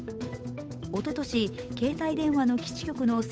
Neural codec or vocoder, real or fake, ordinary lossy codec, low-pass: codec, 16 kHz, 8 kbps, FunCodec, trained on Chinese and English, 25 frames a second; fake; none; none